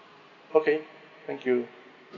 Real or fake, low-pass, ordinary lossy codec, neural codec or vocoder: real; 7.2 kHz; MP3, 64 kbps; none